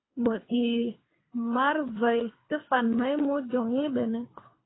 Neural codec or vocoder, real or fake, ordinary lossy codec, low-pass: codec, 24 kHz, 3 kbps, HILCodec; fake; AAC, 16 kbps; 7.2 kHz